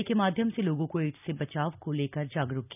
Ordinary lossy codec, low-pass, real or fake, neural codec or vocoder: none; 3.6 kHz; real; none